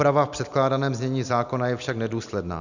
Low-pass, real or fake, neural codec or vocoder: 7.2 kHz; real; none